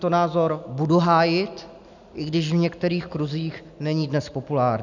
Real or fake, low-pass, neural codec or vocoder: real; 7.2 kHz; none